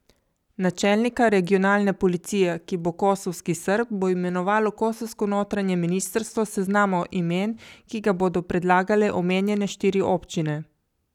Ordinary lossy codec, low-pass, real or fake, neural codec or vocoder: none; 19.8 kHz; real; none